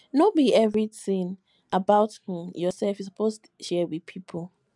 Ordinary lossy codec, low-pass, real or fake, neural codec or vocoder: MP3, 96 kbps; 10.8 kHz; real; none